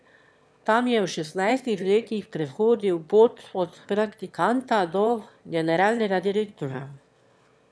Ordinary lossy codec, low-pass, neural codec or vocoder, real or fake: none; none; autoencoder, 22.05 kHz, a latent of 192 numbers a frame, VITS, trained on one speaker; fake